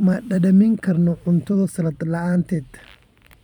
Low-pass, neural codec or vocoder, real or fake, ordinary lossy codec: 19.8 kHz; vocoder, 44.1 kHz, 128 mel bands every 256 samples, BigVGAN v2; fake; none